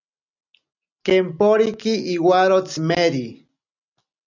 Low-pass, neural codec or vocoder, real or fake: 7.2 kHz; none; real